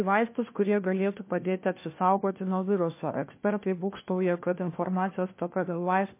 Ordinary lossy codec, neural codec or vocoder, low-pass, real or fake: MP3, 24 kbps; codec, 16 kHz, 1 kbps, FunCodec, trained on LibriTTS, 50 frames a second; 3.6 kHz; fake